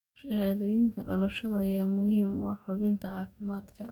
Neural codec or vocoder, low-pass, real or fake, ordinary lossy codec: codec, 44.1 kHz, 2.6 kbps, DAC; 19.8 kHz; fake; none